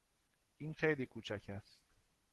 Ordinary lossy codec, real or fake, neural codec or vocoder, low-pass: Opus, 16 kbps; real; none; 14.4 kHz